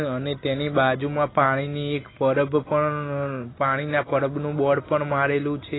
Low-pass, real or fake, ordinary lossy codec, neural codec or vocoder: 7.2 kHz; real; AAC, 16 kbps; none